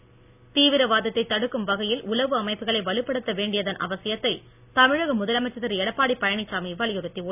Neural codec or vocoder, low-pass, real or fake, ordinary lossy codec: none; 3.6 kHz; real; none